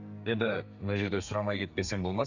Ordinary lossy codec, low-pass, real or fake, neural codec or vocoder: none; 7.2 kHz; fake; codec, 32 kHz, 1.9 kbps, SNAC